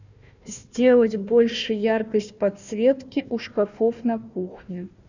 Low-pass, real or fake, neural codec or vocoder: 7.2 kHz; fake; codec, 16 kHz, 1 kbps, FunCodec, trained on Chinese and English, 50 frames a second